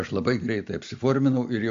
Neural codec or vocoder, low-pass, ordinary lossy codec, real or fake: none; 7.2 kHz; AAC, 64 kbps; real